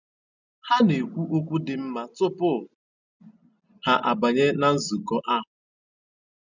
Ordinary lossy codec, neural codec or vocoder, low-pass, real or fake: none; none; 7.2 kHz; real